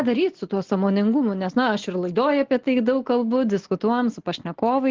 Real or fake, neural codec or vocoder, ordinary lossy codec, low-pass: real; none; Opus, 16 kbps; 7.2 kHz